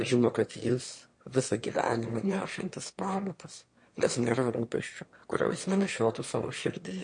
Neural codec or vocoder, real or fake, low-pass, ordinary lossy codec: autoencoder, 22.05 kHz, a latent of 192 numbers a frame, VITS, trained on one speaker; fake; 9.9 kHz; MP3, 48 kbps